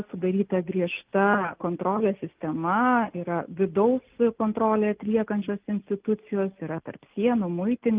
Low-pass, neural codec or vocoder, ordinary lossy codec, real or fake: 3.6 kHz; none; Opus, 32 kbps; real